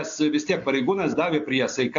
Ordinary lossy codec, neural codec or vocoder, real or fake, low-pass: AAC, 64 kbps; none; real; 7.2 kHz